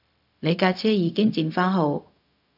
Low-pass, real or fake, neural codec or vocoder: 5.4 kHz; fake; codec, 16 kHz, 0.4 kbps, LongCat-Audio-Codec